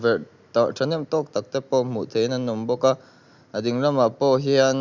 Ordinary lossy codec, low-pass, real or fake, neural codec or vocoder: Opus, 64 kbps; 7.2 kHz; real; none